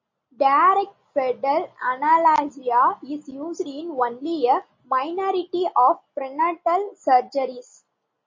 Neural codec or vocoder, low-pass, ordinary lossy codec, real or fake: none; 7.2 kHz; MP3, 32 kbps; real